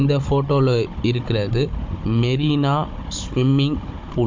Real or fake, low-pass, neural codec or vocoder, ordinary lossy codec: fake; 7.2 kHz; codec, 16 kHz, 16 kbps, FunCodec, trained on Chinese and English, 50 frames a second; MP3, 48 kbps